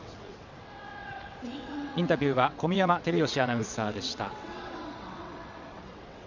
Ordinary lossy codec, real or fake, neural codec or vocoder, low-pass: none; fake; vocoder, 22.05 kHz, 80 mel bands, WaveNeXt; 7.2 kHz